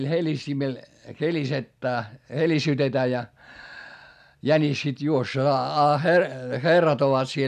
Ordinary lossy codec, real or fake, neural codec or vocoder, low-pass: none; real; none; 14.4 kHz